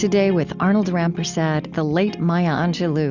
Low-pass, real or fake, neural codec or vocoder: 7.2 kHz; real; none